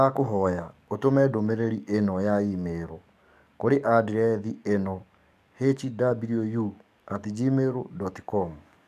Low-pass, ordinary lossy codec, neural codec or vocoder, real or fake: 14.4 kHz; none; codec, 44.1 kHz, 7.8 kbps, DAC; fake